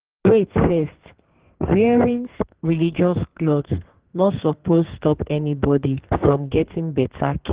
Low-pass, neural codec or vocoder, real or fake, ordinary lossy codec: 3.6 kHz; codec, 32 kHz, 1.9 kbps, SNAC; fake; Opus, 16 kbps